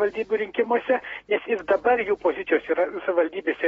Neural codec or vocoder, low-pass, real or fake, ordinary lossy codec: none; 10.8 kHz; real; AAC, 24 kbps